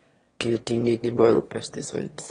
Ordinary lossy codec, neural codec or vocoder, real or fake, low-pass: AAC, 32 kbps; autoencoder, 22.05 kHz, a latent of 192 numbers a frame, VITS, trained on one speaker; fake; 9.9 kHz